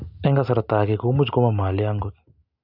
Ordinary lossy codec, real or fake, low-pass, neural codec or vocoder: none; real; 5.4 kHz; none